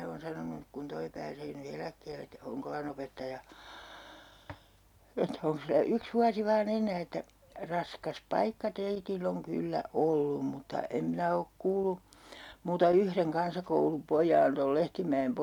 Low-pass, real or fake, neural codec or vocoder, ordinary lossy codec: 19.8 kHz; real; none; none